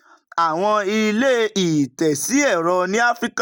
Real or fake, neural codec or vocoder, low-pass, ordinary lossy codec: real; none; none; none